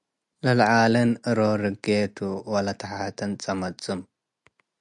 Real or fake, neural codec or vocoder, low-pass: real; none; 10.8 kHz